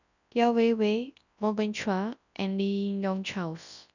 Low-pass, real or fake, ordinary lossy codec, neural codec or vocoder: 7.2 kHz; fake; none; codec, 24 kHz, 0.9 kbps, WavTokenizer, large speech release